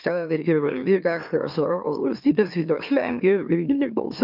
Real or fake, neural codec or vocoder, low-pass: fake; autoencoder, 44.1 kHz, a latent of 192 numbers a frame, MeloTTS; 5.4 kHz